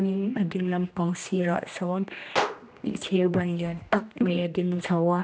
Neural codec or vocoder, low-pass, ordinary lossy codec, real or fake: codec, 16 kHz, 1 kbps, X-Codec, HuBERT features, trained on general audio; none; none; fake